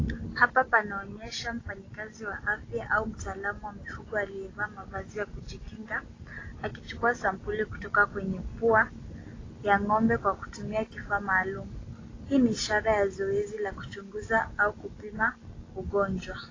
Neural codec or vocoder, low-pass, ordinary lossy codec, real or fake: none; 7.2 kHz; AAC, 32 kbps; real